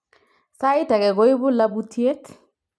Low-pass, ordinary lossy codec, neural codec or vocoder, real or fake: none; none; none; real